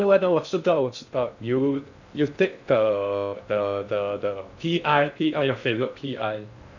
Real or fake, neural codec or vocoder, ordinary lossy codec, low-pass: fake; codec, 16 kHz in and 24 kHz out, 0.6 kbps, FocalCodec, streaming, 2048 codes; none; 7.2 kHz